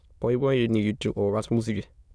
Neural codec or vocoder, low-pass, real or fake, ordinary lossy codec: autoencoder, 22.05 kHz, a latent of 192 numbers a frame, VITS, trained on many speakers; none; fake; none